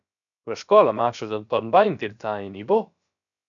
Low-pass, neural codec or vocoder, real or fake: 7.2 kHz; codec, 16 kHz, about 1 kbps, DyCAST, with the encoder's durations; fake